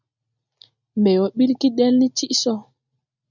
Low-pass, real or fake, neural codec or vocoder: 7.2 kHz; real; none